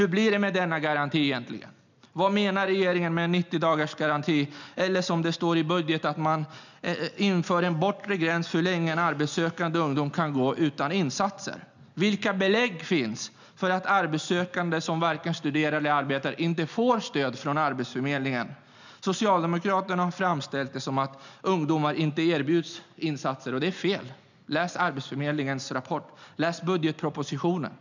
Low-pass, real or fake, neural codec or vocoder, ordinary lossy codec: 7.2 kHz; real; none; none